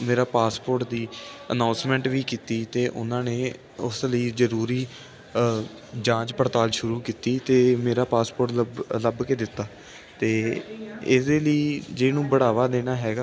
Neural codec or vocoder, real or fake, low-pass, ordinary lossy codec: none; real; none; none